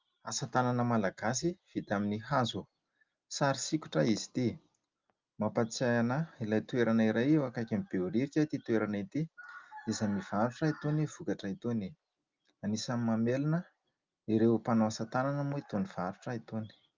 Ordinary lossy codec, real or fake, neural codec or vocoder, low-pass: Opus, 24 kbps; real; none; 7.2 kHz